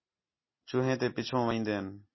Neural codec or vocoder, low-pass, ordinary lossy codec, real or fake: none; 7.2 kHz; MP3, 24 kbps; real